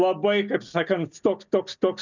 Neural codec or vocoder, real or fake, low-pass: none; real; 7.2 kHz